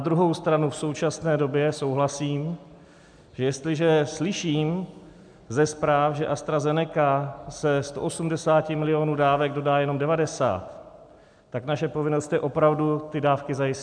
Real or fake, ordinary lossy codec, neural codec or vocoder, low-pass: real; MP3, 96 kbps; none; 9.9 kHz